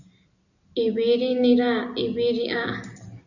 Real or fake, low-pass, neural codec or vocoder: real; 7.2 kHz; none